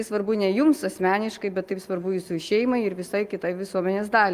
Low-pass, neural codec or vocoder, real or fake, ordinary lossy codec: 14.4 kHz; none; real; Opus, 32 kbps